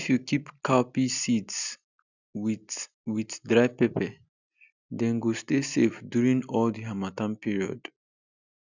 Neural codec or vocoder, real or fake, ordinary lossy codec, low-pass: none; real; none; 7.2 kHz